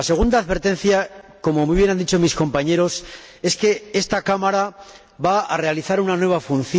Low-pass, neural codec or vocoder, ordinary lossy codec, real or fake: none; none; none; real